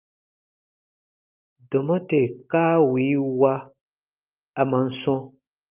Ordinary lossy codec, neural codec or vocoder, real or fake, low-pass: Opus, 24 kbps; none; real; 3.6 kHz